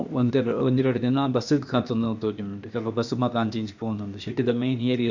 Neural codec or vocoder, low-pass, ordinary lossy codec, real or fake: codec, 16 kHz, 0.8 kbps, ZipCodec; 7.2 kHz; none; fake